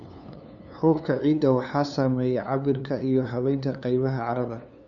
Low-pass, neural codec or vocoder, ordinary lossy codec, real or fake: 7.2 kHz; codec, 16 kHz, 2 kbps, FunCodec, trained on LibriTTS, 25 frames a second; none; fake